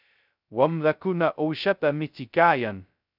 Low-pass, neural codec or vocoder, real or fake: 5.4 kHz; codec, 16 kHz, 0.2 kbps, FocalCodec; fake